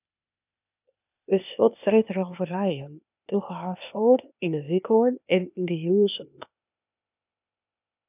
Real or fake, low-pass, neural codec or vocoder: fake; 3.6 kHz; codec, 16 kHz, 0.8 kbps, ZipCodec